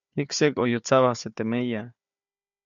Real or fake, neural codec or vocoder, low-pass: fake; codec, 16 kHz, 4 kbps, FunCodec, trained on Chinese and English, 50 frames a second; 7.2 kHz